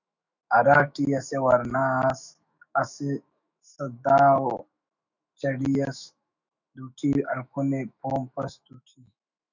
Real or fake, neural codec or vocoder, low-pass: fake; autoencoder, 48 kHz, 128 numbers a frame, DAC-VAE, trained on Japanese speech; 7.2 kHz